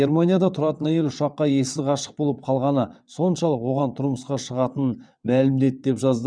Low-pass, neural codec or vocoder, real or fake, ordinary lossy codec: 9.9 kHz; vocoder, 22.05 kHz, 80 mel bands, WaveNeXt; fake; none